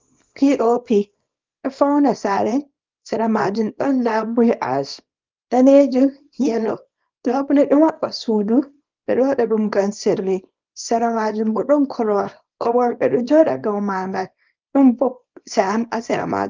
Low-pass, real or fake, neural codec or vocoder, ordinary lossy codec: 7.2 kHz; fake; codec, 24 kHz, 0.9 kbps, WavTokenizer, small release; Opus, 24 kbps